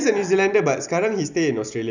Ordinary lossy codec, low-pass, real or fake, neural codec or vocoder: none; 7.2 kHz; real; none